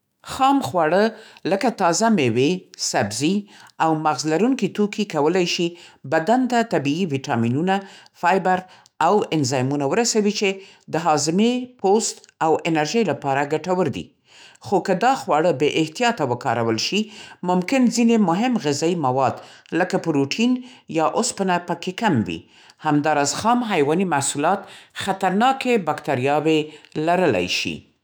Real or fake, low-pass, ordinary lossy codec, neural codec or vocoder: fake; none; none; autoencoder, 48 kHz, 128 numbers a frame, DAC-VAE, trained on Japanese speech